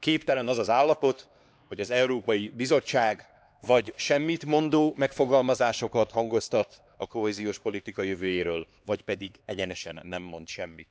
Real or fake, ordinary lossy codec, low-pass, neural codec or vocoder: fake; none; none; codec, 16 kHz, 2 kbps, X-Codec, HuBERT features, trained on LibriSpeech